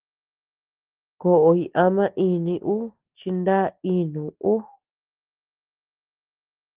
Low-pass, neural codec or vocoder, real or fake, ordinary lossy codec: 3.6 kHz; none; real; Opus, 16 kbps